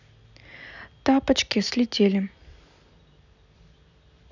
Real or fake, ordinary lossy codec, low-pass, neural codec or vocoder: real; none; 7.2 kHz; none